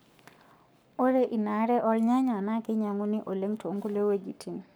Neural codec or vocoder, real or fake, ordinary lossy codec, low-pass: codec, 44.1 kHz, 7.8 kbps, Pupu-Codec; fake; none; none